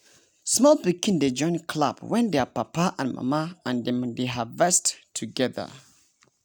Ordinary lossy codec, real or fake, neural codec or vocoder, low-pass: none; real; none; none